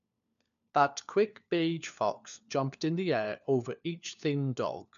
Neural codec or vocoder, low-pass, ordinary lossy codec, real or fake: codec, 16 kHz, 4 kbps, FunCodec, trained on LibriTTS, 50 frames a second; 7.2 kHz; none; fake